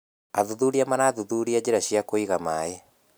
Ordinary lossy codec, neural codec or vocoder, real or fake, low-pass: none; none; real; none